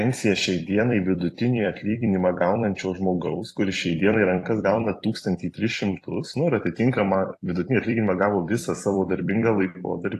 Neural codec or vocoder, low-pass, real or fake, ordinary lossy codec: vocoder, 44.1 kHz, 128 mel bands every 256 samples, BigVGAN v2; 14.4 kHz; fake; AAC, 48 kbps